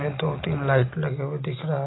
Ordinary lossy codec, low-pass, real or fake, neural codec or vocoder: AAC, 16 kbps; 7.2 kHz; real; none